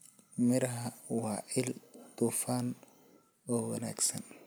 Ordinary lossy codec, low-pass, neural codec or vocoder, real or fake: none; none; none; real